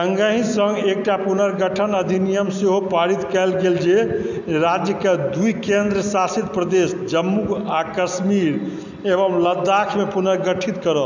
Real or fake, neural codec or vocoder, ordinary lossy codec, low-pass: real; none; none; 7.2 kHz